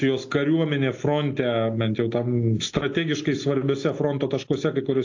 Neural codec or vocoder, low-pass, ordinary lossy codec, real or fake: none; 7.2 kHz; AAC, 48 kbps; real